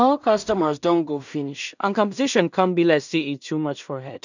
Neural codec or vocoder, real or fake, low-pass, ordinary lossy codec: codec, 16 kHz in and 24 kHz out, 0.4 kbps, LongCat-Audio-Codec, two codebook decoder; fake; 7.2 kHz; none